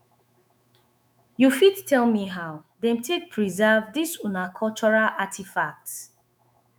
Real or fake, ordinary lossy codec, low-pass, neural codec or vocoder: fake; none; none; autoencoder, 48 kHz, 128 numbers a frame, DAC-VAE, trained on Japanese speech